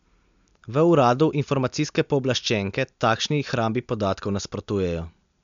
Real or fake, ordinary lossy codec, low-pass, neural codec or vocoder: real; MP3, 64 kbps; 7.2 kHz; none